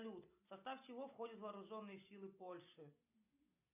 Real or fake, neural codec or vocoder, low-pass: real; none; 3.6 kHz